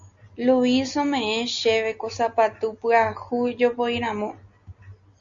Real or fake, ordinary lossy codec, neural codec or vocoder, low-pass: real; Opus, 64 kbps; none; 7.2 kHz